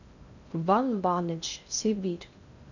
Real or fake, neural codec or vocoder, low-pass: fake; codec, 16 kHz in and 24 kHz out, 0.6 kbps, FocalCodec, streaming, 2048 codes; 7.2 kHz